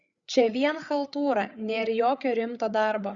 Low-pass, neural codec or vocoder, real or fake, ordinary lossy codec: 7.2 kHz; codec, 16 kHz, 8 kbps, FreqCodec, larger model; fake; Opus, 64 kbps